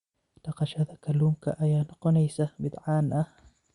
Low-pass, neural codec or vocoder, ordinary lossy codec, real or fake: 10.8 kHz; none; Opus, 64 kbps; real